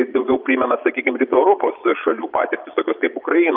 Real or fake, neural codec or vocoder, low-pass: fake; vocoder, 22.05 kHz, 80 mel bands, Vocos; 5.4 kHz